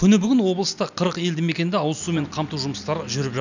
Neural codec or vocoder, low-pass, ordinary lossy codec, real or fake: none; 7.2 kHz; none; real